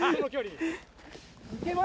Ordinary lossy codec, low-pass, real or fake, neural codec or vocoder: none; none; real; none